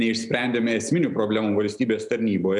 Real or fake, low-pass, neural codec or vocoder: real; 10.8 kHz; none